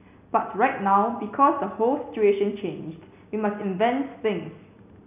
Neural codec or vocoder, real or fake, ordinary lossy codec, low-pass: none; real; none; 3.6 kHz